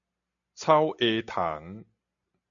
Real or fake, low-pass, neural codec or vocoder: real; 7.2 kHz; none